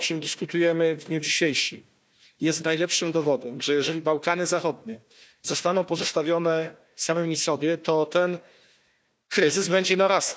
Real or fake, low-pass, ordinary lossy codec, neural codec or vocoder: fake; none; none; codec, 16 kHz, 1 kbps, FunCodec, trained on Chinese and English, 50 frames a second